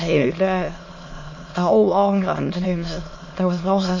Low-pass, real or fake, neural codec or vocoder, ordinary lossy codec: 7.2 kHz; fake; autoencoder, 22.05 kHz, a latent of 192 numbers a frame, VITS, trained on many speakers; MP3, 32 kbps